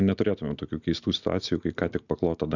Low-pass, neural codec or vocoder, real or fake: 7.2 kHz; none; real